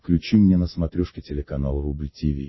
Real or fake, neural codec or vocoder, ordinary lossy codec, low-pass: real; none; MP3, 24 kbps; 7.2 kHz